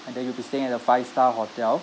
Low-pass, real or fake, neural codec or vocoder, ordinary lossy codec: none; real; none; none